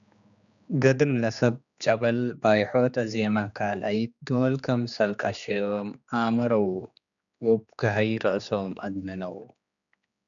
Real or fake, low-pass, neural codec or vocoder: fake; 7.2 kHz; codec, 16 kHz, 2 kbps, X-Codec, HuBERT features, trained on general audio